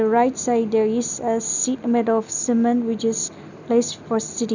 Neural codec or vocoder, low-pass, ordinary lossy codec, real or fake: none; 7.2 kHz; none; real